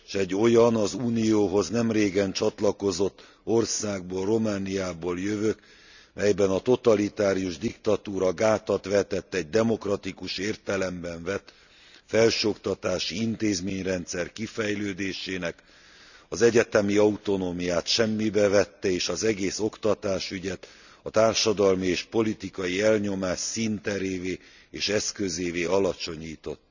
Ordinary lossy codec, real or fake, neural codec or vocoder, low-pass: none; real; none; 7.2 kHz